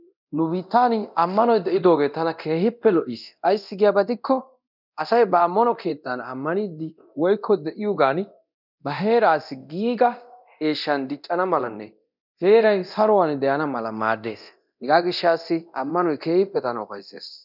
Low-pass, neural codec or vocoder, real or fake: 5.4 kHz; codec, 24 kHz, 0.9 kbps, DualCodec; fake